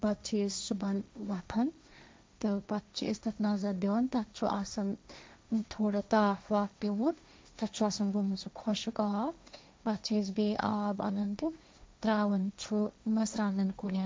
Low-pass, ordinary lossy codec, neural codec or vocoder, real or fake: none; none; codec, 16 kHz, 1.1 kbps, Voila-Tokenizer; fake